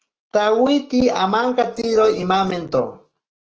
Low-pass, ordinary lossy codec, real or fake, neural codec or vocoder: 7.2 kHz; Opus, 16 kbps; fake; autoencoder, 48 kHz, 128 numbers a frame, DAC-VAE, trained on Japanese speech